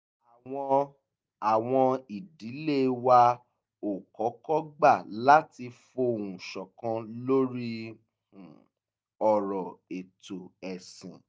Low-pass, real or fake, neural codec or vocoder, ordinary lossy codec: none; real; none; none